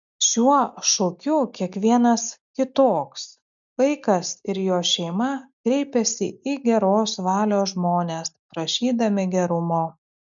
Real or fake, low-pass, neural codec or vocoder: real; 7.2 kHz; none